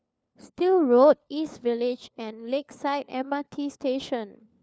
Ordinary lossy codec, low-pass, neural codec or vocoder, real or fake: none; none; codec, 16 kHz, 4 kbps, FunCodec, trained on LibriTTS, 50 frames a second; fake